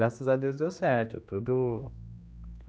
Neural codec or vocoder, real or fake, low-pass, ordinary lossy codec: codec, 16 kHz, 2 kbps, X-Codec, HuBERT features, trained on general audio; fake; none; none